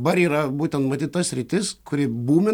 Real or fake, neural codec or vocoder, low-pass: real; none; 19.8 kHz